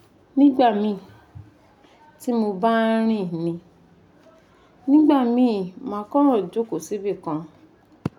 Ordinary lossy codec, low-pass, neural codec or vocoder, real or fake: none; 19.8 kHz; none; real